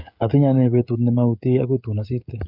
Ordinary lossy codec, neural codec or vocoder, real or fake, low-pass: none; codec, 16 kHz, 16 kbps, FreqCodec, smaller model; fake; 5.4 kHz